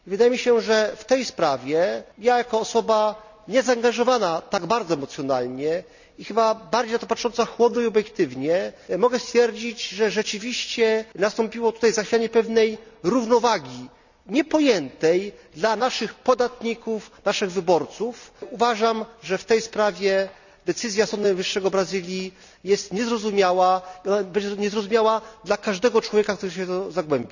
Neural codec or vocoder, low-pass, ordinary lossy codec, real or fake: none; 7.2 kHz; none; real